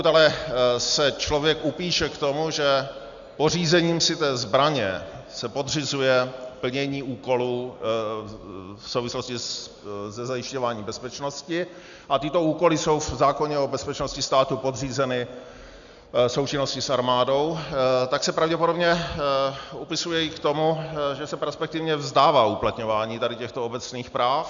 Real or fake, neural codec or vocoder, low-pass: real; none; 7.2 kHz